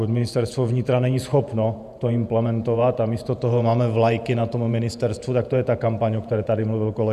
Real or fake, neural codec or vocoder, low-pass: fake; vocoder, 48 kHz, 128 mel bands, Vocos; 14.4 kHz